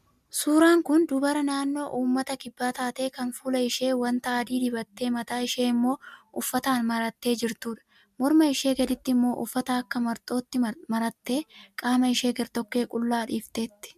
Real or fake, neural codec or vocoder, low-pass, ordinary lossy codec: real; none; 14.4 kHz; MP3, 96 kbps